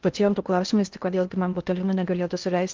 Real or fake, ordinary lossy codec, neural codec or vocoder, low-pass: fake; Opus, 32 kbps; codec, 16 kHz in and 24 kHz out, 0.6 kbps, FocalCodec, streaming, 4096 codes; 7.2 kHz